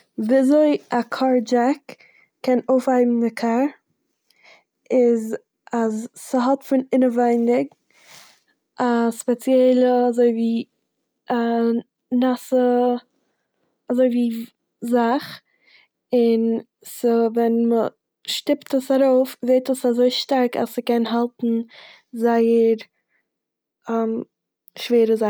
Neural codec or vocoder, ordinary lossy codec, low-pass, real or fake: none; none; none; real